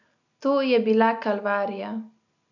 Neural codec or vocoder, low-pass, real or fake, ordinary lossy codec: none; 7.2 kHz; real; none